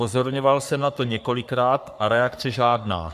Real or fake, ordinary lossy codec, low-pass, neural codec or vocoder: fake; AAC, 96 kbps; 14.4 kHz; codec, 44.1 kHz, 3.4 kbps, Pupu-Codec